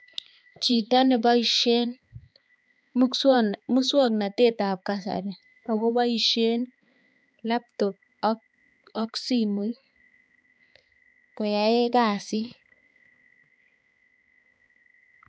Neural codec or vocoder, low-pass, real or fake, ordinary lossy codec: codec, 16 kHz, 4 kbps, X-Codec, HuBERT features, trained on balanced general audio; none; fake; none